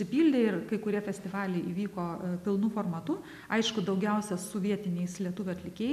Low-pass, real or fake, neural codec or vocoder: 14.4 kHz; real; none